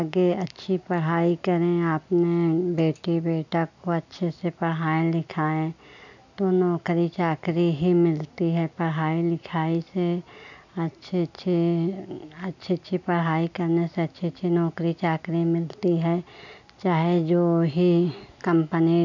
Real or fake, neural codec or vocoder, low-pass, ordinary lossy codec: real; none; 7.2 kHz; none